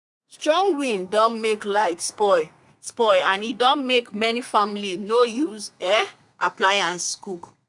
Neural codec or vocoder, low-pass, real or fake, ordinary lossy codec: codec, 32 kHz, 1.9 kbps, SNAC; 10.8 kHz; fake; none